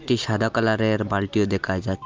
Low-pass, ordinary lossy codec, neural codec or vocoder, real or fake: 7.2 kHz; Opus, 32 kbps; none; real